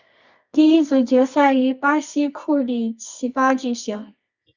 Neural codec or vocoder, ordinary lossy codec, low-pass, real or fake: codec, 24 kHz, 0.9 kbps, WavTokenizer, medium music audio release; Opus, 64 kbps; 7.2 kHz; fake